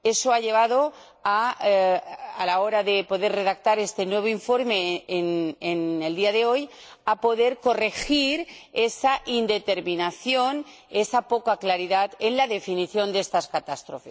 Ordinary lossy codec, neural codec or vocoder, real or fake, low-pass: none; none; real; none